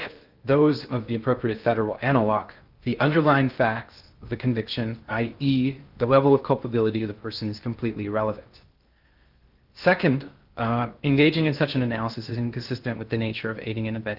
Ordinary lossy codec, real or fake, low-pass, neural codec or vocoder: Opus, 32 kbps; fake; 5.4 kHz; codec, 16 kHz in and 24 kHz out, 0.6 kbps, FocalCodec, streaming, 2048 codes